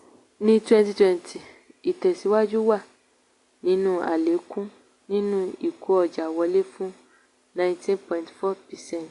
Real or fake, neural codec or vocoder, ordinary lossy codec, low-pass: real; none; AAC, 48 kbps; 10.8 kHz